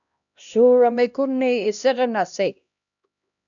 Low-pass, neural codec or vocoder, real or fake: 7.2 kHz; codec, 16 kHz, 1 kbps, X-Codec, HuBERT features, trained on LibriSpeech; fake